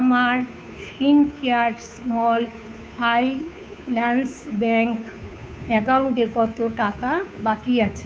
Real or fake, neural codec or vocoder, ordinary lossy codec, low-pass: fake; codec, 16 kHz, 2 kbps, FunCodec, trained on Chinese and English, 25 frames a second; none; none